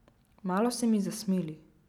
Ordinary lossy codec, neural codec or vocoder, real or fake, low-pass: none; none; real; 19.8 kHz